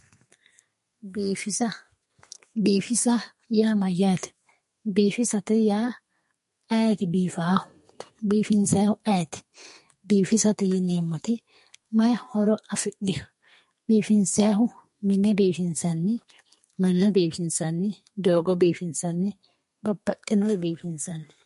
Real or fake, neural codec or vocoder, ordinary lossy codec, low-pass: fake; codec, 32 kHz, 1.9 kbps, SNAC; MP3, 48 kbps; 14.4 kHz